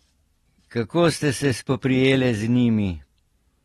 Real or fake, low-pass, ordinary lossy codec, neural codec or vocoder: real; 19.8 kHz; AAC, 32 kbps; none